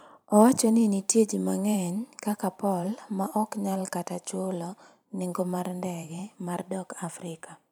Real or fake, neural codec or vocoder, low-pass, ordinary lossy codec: fake; vocoder, 44.1 kHz, 128 mel bands every 512 samples, BigVGAN v2; none; none